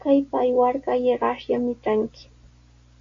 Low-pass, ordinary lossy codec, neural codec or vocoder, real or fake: 7.2 kHz; AAC, 48 kbps; none; real